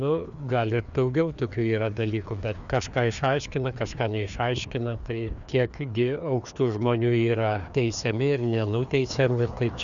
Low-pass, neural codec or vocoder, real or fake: 7.2 kHz; codec, 16 kHz, 2 kbps, FreqCodec, larger model; fake